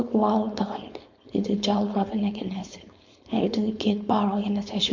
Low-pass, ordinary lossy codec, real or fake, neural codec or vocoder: 7.2 kHz; MP3, 48 kbps; fake; codec, 16 kHz, 4.8 kbps, FACodec